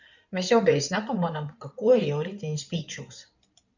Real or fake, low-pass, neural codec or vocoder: fake; 7.2 kHz; codec, 16 kHz in and 24 kHz out, 2.2 kbps, FireRedTTS-2 codec